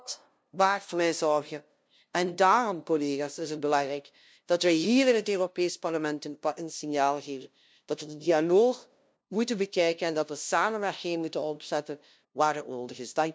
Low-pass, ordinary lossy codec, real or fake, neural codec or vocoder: none; none; fake; codec, 16 kHz, 0.5 kbps, FunCodec, trained on LibriTTS, 25 frames a second